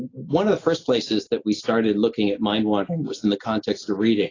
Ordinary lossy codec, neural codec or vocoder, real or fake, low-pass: AAC, 32 kbps; none; real; 7.2 kHz